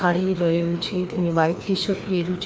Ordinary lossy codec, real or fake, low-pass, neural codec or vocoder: none; fake; none; codec, 16 kHz, 2 kbps, FreqCodec, larger model